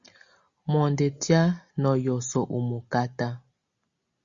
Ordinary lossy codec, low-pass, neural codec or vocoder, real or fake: Opus, 64 kbps; 7.2 kHz; none; real